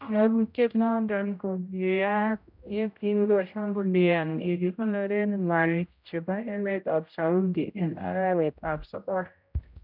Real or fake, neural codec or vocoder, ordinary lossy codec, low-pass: fake; codec, 16 kHz, 0.5 kbps, X-Codec, HuBERT features, trained on general audio; none; 5.4 kHz